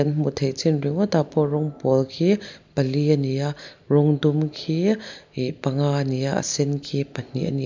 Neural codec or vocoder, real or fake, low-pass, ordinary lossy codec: none; real; 7.2 kHz; MP3, 64 kbps